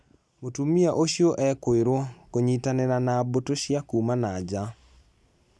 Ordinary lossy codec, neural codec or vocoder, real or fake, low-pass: none; none; real; none